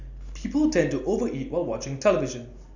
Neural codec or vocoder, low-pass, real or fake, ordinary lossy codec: none; 7.2 kHz; real; none